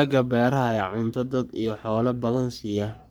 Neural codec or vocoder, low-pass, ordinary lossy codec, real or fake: codec, 44.1 kHz, 3.4 kbps, Pupu-Codec; none; none; fake